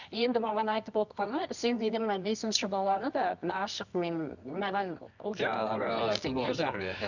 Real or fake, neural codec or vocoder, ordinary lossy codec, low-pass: fake; codec, 24 kHz, 0.9 kbps, WavTokenizer, medium music audio release; none; 7.2 kHz